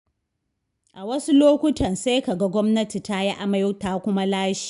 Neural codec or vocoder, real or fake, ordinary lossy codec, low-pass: none; real; none; 10.8 kHz